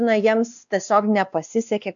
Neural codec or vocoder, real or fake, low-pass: codec, 16 kHz, 2 kbps, X-Codec, WavLM features, trained on Multilingual LibriSpeech; fake; 7.2 kHz